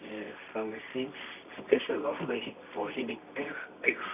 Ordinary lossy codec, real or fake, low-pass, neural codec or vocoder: none; fake; 3.6 kHz; codec, 24 kHz, 0.9 kbps, WavTokenizer, medium music audio release